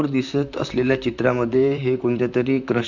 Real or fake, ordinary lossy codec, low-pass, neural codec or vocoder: fake; none; 7.2 kHz; vocoder, 44.1 kHz, 128 mel bands, Pupu-Vocoder